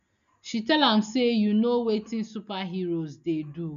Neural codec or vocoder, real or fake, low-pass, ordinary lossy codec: none; real; 7.2 kHz; none